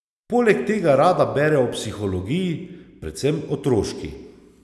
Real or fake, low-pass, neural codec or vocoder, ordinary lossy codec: real; none; none; none